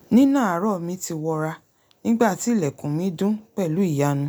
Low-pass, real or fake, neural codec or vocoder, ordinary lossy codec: none; real; none; none